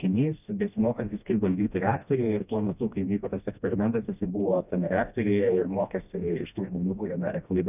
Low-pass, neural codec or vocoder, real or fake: 3.6 kHz; codec, 16 kHz, 1 kbps, FreqCodec, smaller model; fake